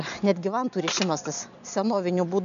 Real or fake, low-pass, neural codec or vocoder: real; 7.2 kHz; none